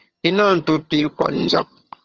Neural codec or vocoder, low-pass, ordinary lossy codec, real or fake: vocoder, 22.05 kHz, 80 mel bands, HiFi-GAN; 7.2 kHz; Opus, 24 kbps; fake